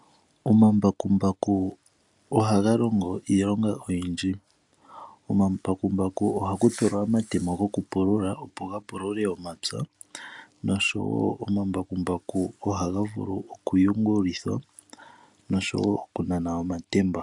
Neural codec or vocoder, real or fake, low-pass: none; real; 10.8 kHz